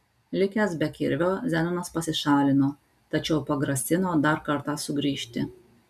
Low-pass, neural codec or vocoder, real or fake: 14.4 kHz; none; real